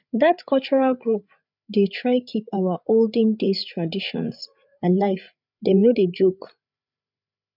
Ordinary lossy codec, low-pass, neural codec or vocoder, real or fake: none; 5.4 kHz; codec, 16 kHz, 8 kbps, FreqCodec, larger model; fake